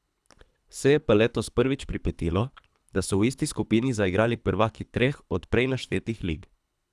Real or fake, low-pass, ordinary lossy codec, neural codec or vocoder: fake; none; none; codec, 24 kHz, 3 kbps, HILCodec